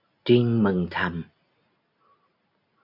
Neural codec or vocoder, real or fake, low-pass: none; real; 5.4 kHz